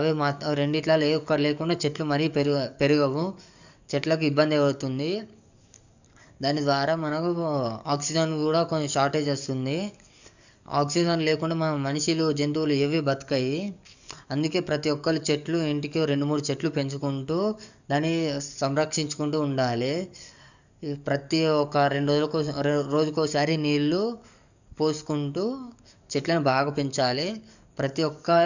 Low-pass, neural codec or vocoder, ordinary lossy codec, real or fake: 7.2 kHz; codec, 44.1 kHz, 7.8 kbps, DAC; none; fake